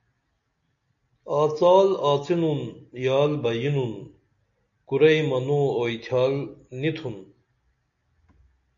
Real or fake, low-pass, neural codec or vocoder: real; 7.2 kHz; none